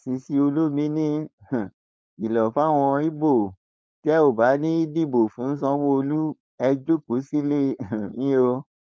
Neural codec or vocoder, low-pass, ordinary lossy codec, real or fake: codec, 16 kHz, 4.8 kbps, FACodec; none; none; fake